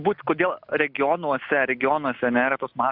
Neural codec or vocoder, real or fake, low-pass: none; real; 5.4 kHz